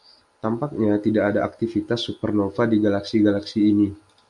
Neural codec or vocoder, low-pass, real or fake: none; 10.8 kHz; real